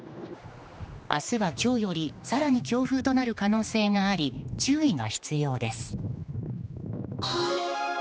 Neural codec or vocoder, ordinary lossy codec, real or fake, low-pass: codec, 16 kHz, 2 kbps, X-Codec, HuBERT features, trained on general audio; none; fake; none